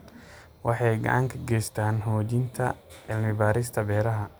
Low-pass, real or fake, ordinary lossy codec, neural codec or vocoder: none; real; none; none